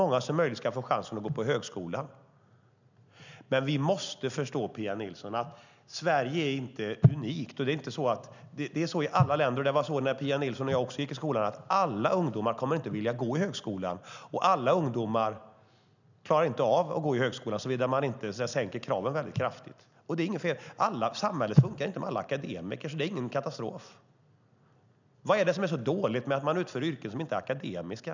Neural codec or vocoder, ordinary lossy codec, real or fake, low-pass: none; none; real; 7.2 kHz